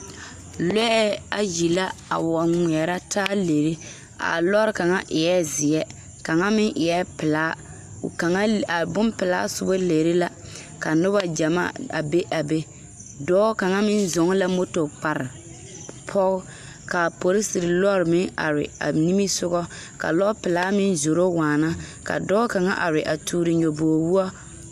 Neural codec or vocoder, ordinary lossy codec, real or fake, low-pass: none; AAC, 96 kbps; real; 14.4 kHz